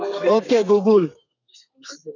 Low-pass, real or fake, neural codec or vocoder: 7.2 kHz; fake; codec, 32 kHz, 1.9 kbps, SNAC